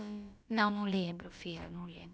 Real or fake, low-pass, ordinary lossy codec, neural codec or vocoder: fake; none; none; codec, 16 kHz, about 1 kbps, DyCAST, with the encoder's durations